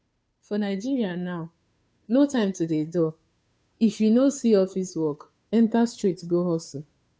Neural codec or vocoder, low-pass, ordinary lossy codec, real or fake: codec, 16 kHz, 2 kbps, FunCodec, trained on Chinese and English, 25 frames a second; none; none; fake